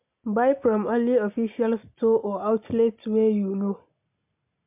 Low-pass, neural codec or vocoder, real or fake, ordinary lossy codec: 3.6 kHz; none; real; MP3, 32 kbps